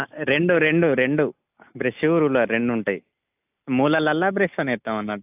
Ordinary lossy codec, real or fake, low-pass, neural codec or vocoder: AAC, 32 kbps; real; 3.6 kHz; none